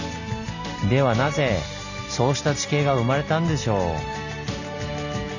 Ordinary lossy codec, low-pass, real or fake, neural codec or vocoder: none; 7.2 kHz; real; none